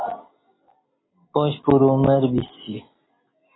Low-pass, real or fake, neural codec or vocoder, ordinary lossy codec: 7.2 kHz; real; none; AAC, 16 kbps